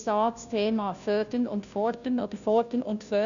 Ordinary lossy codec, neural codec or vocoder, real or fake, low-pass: none; codec, 16 kHz, 0.5 kbps, FunCodec, trained on Chinese and English, 25 frames a second; fake; 7.2 kHz